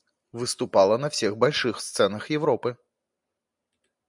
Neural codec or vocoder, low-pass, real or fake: none; 10.8 kHz; real